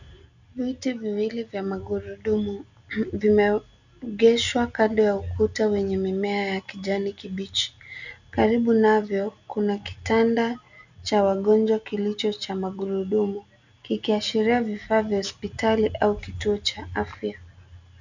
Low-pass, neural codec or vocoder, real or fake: 7.2 kHz; none; real